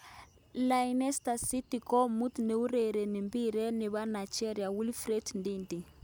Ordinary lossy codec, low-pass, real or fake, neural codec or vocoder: none; none; real; none